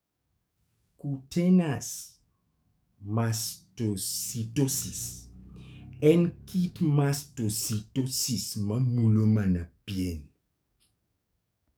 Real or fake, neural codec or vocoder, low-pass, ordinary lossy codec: fake; codec, 44.1 kHz, 7.8 kbps, DAC; none; none